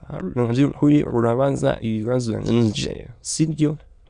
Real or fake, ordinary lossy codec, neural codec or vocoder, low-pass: fake; Opus, 64 kbps; autoencoder, 22.05 kHz, a latent of 192 numbers a frame, VITS, trained on many speakers; 9.9 kHz